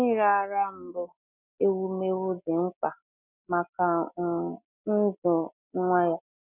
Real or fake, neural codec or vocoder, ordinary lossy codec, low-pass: real; none; none; 3.6 kHz